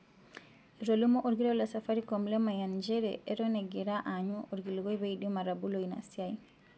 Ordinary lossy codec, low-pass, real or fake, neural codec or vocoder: none; none; real; none